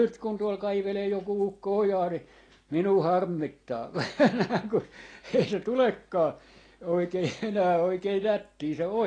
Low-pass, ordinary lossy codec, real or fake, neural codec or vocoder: 9.9 kHz; AAC, 32 kbps; real; none